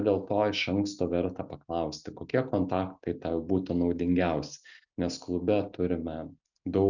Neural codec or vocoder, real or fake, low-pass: none; real; 7.2 kHz